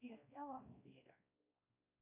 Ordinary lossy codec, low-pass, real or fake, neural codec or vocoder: MP3, 24 kbps; 3.6 kHz; fake; codec, 16 kHz, 1 kbps, X-Codec, WavLM features, trained on Multilingual LibriSpeech